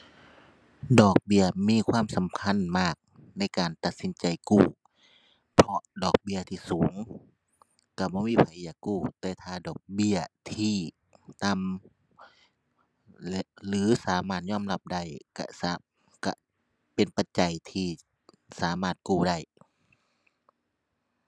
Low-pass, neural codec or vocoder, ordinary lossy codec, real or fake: none; none; none; real